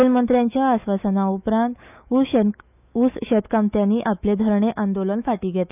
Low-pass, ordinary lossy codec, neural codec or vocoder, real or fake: 3.6 kHz; none; autoencoder, 48 kHz, 128 numbers a frame, DAC-VAE, trained on Japanese speech; fake